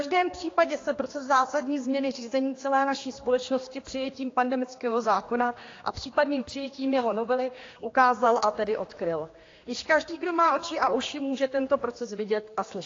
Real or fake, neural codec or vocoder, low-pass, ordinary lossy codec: fake; codec, 16 kHz, 2 kbps, X-Codec, HuBERT features, trained on general audio; 7.2 kHz; AAC, 32 kbps